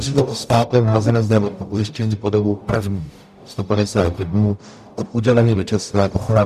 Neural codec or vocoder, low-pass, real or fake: codec, 44.1 kHz, 0.9 kbps, DAC; 14.4 kHz; fake